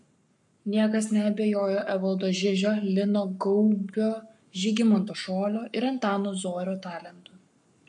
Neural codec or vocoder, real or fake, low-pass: codec, 44.1 kHz, 7.8 kbps, Pupu-Codec; fake; 10.8 kHz